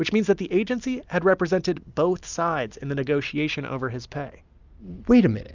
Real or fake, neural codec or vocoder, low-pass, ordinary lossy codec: real; none; 7.2 kHz; Opus, 64 kbps